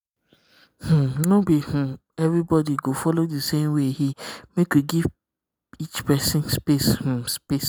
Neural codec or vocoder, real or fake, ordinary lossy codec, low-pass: none; real; none; none